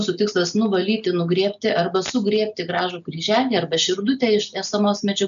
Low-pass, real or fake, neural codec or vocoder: 7.2 kHz; real; none